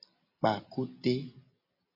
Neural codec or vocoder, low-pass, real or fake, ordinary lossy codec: none; 5.4 kHz; real; MP3, 24 kbps